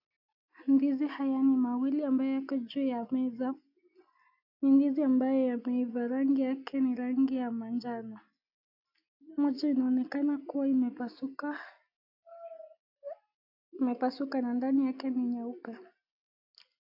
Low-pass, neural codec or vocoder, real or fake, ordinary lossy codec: 5.4 kHz; none; real; AAC, 32 kbps